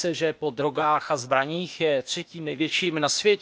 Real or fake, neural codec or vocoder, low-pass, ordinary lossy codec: fake; codec, 16 kHz, 0.8 kbps, ZipCodec; none; none